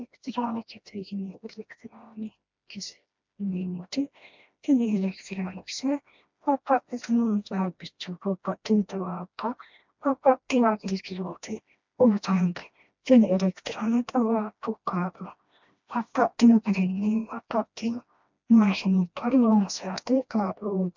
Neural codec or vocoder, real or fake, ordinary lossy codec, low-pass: codec, 16 kHz, 1 kbps, FreqCodec, smaller model; fake; MP3, 64 kbps; 7.2 kHz